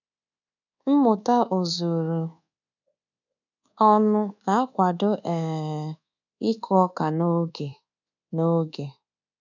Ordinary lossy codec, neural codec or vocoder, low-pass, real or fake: none; codec, 24 kHz, 1.2 kbps, DualCodec; 7.2 kHz; fake